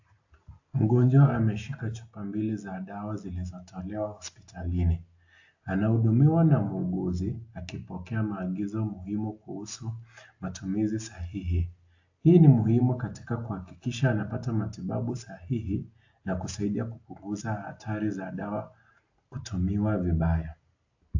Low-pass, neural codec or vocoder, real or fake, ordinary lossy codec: 7.2 kHz; none; real; AAC, 48 kbps